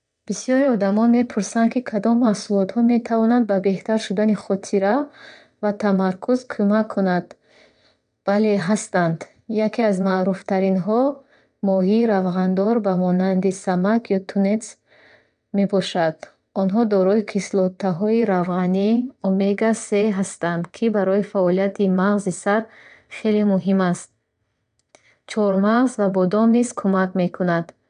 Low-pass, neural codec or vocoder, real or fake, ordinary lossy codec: 9.9 kHz; vocoder, 22.05 kHz, 80 mel bands, WaveNeXt; fake; none